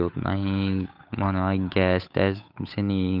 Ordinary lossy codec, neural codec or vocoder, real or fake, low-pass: none; codec, 16 kHz, 8 kbps, FunCodec, trained on Chinese and English, 25 frames a second; fake; 5.4 kHz